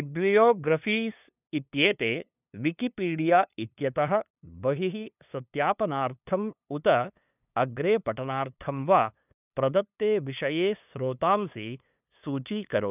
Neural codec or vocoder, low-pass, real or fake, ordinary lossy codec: codec, 16 kHz, 2 kbps, FunCodec, trained on LibriTTS, 25 frames a second; 3.6 kHz; fake; none